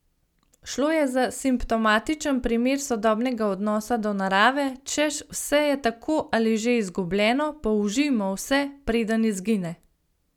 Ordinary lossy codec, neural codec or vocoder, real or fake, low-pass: none; none; real; 19.8 kHz